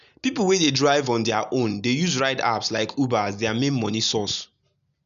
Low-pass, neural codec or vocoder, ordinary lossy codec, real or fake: 7.2 kHz; none; none; real